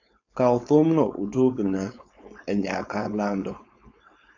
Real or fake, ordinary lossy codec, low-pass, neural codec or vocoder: fake; AAC, 48 kbps; 7.2 kHz; codec, 16 kHz, 4.8 kbps, FACodec